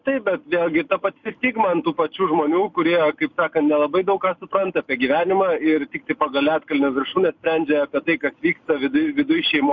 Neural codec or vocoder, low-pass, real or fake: none; 7.2 kHz; real